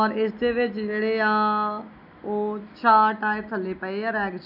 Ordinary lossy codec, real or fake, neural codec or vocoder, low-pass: none; real; none; 5.4 kHz